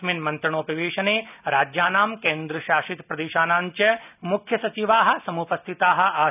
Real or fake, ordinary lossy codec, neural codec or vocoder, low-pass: real; none; none; 3.6 kHz